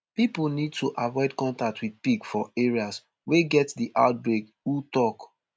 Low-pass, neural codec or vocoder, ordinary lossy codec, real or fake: none; none; none; real